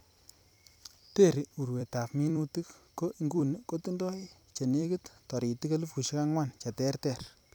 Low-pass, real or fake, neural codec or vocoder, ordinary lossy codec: none; fake; vocoder, 44.1 kHz, 128 mel bands every 256 samples, BigVGAN v2; none